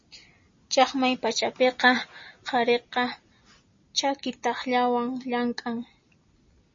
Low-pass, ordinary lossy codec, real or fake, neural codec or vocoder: 7.2 kHz; MP3, 32 kbps; real; none